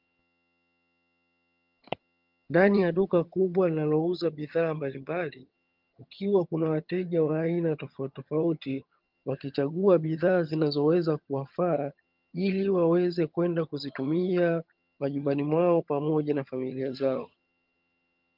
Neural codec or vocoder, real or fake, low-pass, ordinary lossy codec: vocoder, 22.05 kHz, 80 mel bands, HiFi-GAN; fake; 5.4 kHz; Opus, 24 kbps